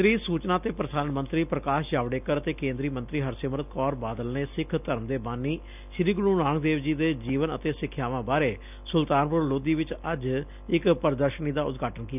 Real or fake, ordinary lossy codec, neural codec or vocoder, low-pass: real; none; none; 3.6 kHz